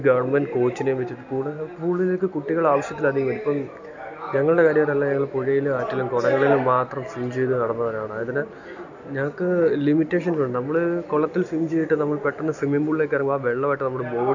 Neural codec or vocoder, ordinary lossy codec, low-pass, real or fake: none; none; 7.2 kHz; real